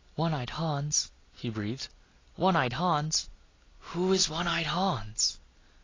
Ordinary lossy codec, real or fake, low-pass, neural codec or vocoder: AAC, 32 kbps; real; 7.2 kHz; none